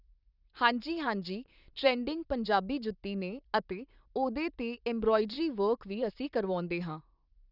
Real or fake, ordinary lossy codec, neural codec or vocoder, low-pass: fake; none; vocoder, 44.1 kHz, 128 mel bands, Pupu-Vocoder; 5.4 kHz